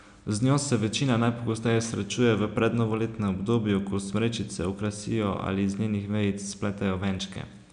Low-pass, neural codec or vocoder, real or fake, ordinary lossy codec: 9.9 kHz; none; real; none